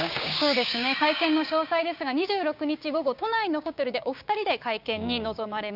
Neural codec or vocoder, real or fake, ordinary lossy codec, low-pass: codec, 16 kHz, 6 kbps, DAC; fake; none; 5.4 kHz